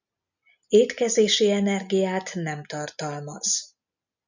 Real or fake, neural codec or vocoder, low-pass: real; none; 7.2 kHz